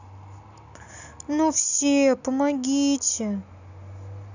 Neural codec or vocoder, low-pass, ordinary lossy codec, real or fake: none; 7.2 kHz; none; real